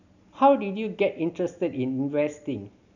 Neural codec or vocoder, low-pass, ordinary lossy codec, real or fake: none; 7.2 kHz; none; real